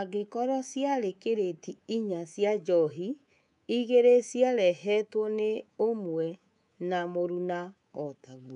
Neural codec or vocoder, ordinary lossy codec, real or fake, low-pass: codec, 24 kHz, 3.1 kbps, DualCodec; none; fake; 10.8 kHz